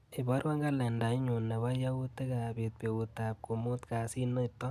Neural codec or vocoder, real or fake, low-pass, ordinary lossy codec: none; real; 14.4 kHz; none